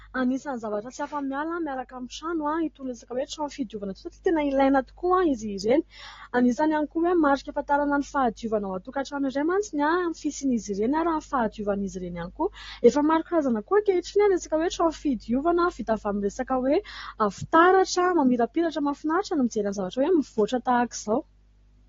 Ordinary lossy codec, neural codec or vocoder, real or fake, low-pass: AAC, 32 kbps; none; real; 7.2 kHz